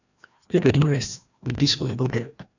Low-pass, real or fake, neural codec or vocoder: 7.2 kHz; fake; codec, 16 kHz, 1 kbps, FreqCodec, larger model